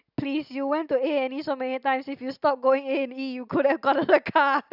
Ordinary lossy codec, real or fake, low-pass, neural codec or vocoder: none; real; 5.4 kHz; none